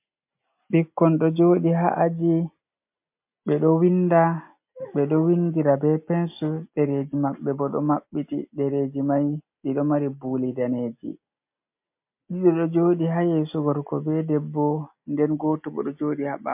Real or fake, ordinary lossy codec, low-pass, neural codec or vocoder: real; MP3, 32 kbps; 3.6 kHz; none